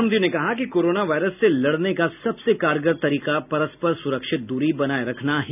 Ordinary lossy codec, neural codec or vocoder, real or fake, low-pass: none; none; real; 3.6 kHz